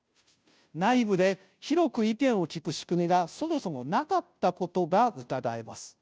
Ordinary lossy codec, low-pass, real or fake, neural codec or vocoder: none; none; fake; codec, 16 kHz, 0.5 kbps, FunCodec, trained on Chinese and English, 25 frames a second